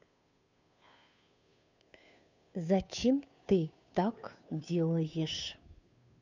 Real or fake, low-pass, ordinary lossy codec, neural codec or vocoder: fake; 7.2 kHz; none; codec, 16 kHz, 2 kbps, FunCodec, trained on Chinese and English, 25 frames a second